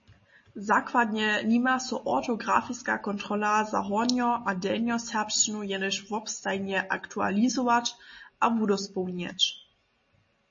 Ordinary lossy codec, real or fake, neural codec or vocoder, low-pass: MP3, 32 kbps; real; none; 7.2 kHz